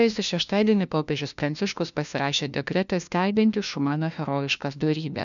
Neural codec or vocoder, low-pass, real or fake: codec, 16 kHz, 1 kbps, FunCodec, trained on LibriTTS, 50 frames a second; 7.2 kHz; fake